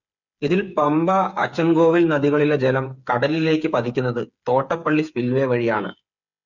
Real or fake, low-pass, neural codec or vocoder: fake; 7.2 kHz; codec, 16 kHz, 8 kbps, FreqCodec, smaller model